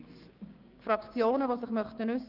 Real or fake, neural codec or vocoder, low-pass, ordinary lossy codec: fake; codec, 16 kHz, 6 kbps, DAC; 5.4 kHz; Opus, 32 kbps